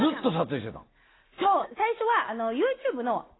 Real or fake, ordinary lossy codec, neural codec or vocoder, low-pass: real; AAC, 16 kbps; none; 7.2 kHz